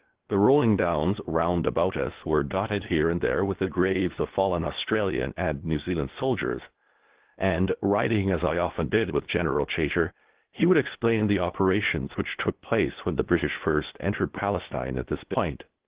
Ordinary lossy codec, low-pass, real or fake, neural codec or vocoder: Opus, 16 kbps; 3.6 kHz; fake; codec, 16 kHz, 0.8 kbps, ZipCodec